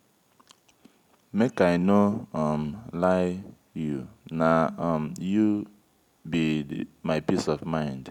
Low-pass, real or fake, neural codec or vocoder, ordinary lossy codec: 19.8 kHz; real; none; none